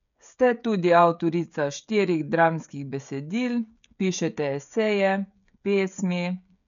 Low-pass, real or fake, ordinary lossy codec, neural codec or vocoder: 7.2 kHz; fake; none; codec, 16 kHz, 16 kbps, FreqCodec, smaller model